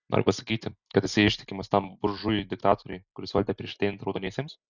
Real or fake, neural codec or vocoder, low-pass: real; none; 7.2 kHz